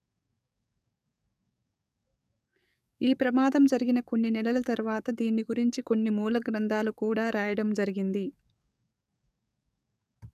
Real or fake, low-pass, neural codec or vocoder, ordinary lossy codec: fake; 14.4 kHz; codec, 44.1 kHz, 7.8 kbps, DAC; none